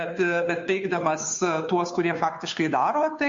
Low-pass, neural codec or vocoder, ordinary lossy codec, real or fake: 7.2 kHz; codec, 16 kHz, 2 kbps, FunCodec, trained on Chinese and English, 25 frames a second; MP3, 48 kbps; fake